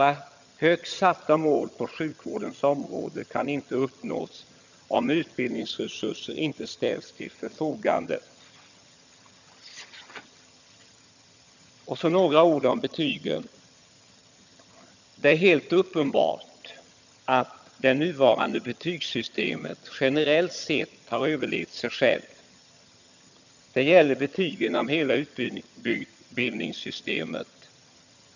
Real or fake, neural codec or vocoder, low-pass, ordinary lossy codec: fake; vocoder, 22.05 kHz, 80 mel bands, HiFi-GAN; 7.2 kHz; none